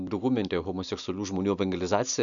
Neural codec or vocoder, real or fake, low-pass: none; real; 7.2 kHz